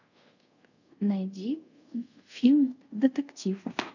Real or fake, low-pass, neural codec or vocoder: fake; 7.2 kHz; codec, 24 kHz, 0.5 kbps, DualCodec